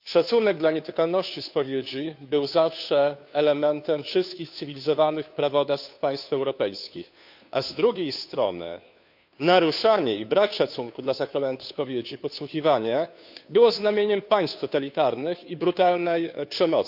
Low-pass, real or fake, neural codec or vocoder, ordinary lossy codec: 5.4 kHz; fake; codec, 16 kHz, 2 kbps, FunCodec, trained on Chinese and English, 25 frames a second; none